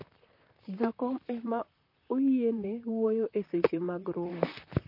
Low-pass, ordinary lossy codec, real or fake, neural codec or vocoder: 5.4 kHz; MP3, 32 kbps; fake; vocoder, 44.1 kHz, 128 mel bands, Pupu-Vocoder